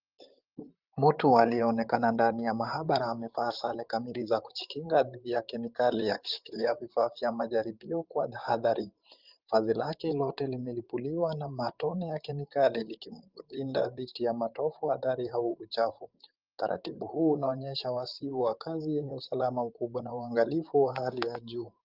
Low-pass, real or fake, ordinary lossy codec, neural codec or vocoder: 5.4 kHz; fake; Opus, 24 kbps; vocoder, 44.1 kHz, 128 mel bands, Pupu-Vocoder